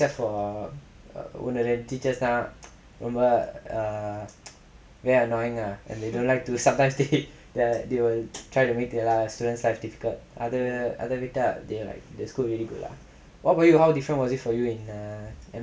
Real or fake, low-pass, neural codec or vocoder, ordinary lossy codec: real; none; none; none